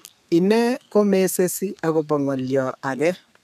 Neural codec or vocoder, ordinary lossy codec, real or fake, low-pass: codec, 32 kHz, 1.9 kbps, SNAC; none; fake; 14.4 kHz